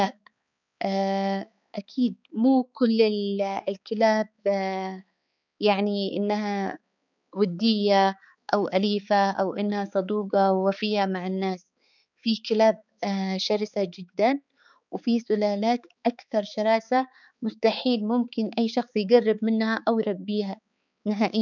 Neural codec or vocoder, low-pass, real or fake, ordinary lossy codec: codec, 16 kHz, 4 kbps, X-Codec, HuBERT features, trained on balanced general audio; 7.2 kHz; fake; none